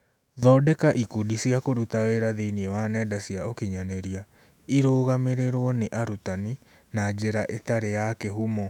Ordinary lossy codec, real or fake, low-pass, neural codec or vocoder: none; fake; 19.8 kHz; autoencoder, 48 kHz, 128 numbers a frame, DAC-VAE, trained on Japanese speech